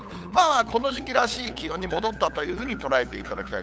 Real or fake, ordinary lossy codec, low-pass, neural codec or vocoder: fake; none; none; codec, 16 kHz, 8 kbps, FunCodec, trained on LibriTTS, 25 frames a second